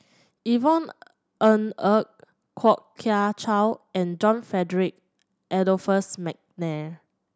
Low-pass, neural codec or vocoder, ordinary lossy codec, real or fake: none; none; none; real